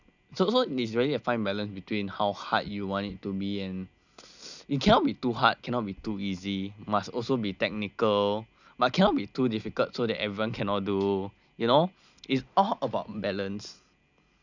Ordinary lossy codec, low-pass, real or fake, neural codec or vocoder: none; 7.2 kHz; real; none